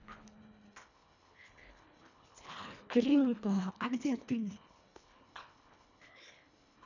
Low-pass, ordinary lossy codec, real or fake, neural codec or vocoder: 7.2 kHz; none; fake; codec, 24 kHz, 1.5 kbps, HILCodec